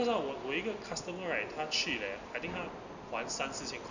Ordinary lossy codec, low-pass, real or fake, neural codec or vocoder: none; 7.2 kHz; real; none